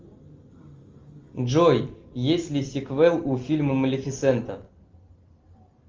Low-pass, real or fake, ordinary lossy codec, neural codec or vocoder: 7.2 kHz; real; Opus, 32 kbps; none